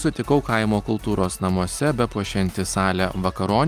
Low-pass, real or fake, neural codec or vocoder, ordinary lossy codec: 14.4 kHz; real; none; Opus, 32 kbps